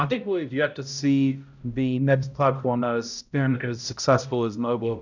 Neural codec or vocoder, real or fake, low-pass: codec, 16 kHz, 0.5 kbps, X-Codec, HuBERT features, trained on balanced general audio; fake; 7.2 kHz